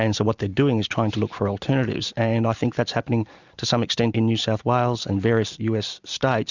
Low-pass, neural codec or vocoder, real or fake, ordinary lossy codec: 7.2 kHz; none; real; Opus, 64 kbps